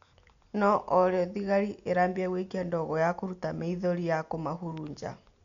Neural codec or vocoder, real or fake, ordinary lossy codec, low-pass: none; real; none; 7.2 kHz